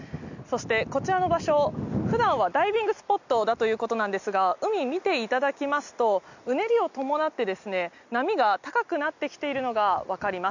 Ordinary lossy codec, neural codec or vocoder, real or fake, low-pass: none; none; real; 7.2 kHz